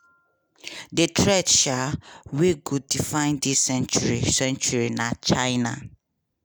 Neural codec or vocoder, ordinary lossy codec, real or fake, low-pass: none; none; real; none